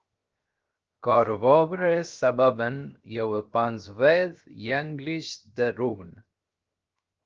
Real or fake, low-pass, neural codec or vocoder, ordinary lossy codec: fake; 7.2 kHz; codec, 16 kHz, 0.7 kbps, FocalCodec; Opus, 24 kbps